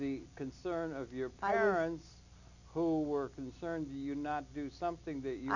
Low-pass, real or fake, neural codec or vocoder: 7.2 kHz; real; none